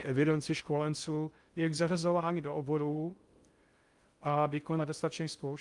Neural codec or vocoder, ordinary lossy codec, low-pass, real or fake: codec, 16 kHz in and 24 kHz out, 0.6 kbps, FocalCodec, streaming, 2048 codes; Opus, 32 kbps; 10.8 kHz; fake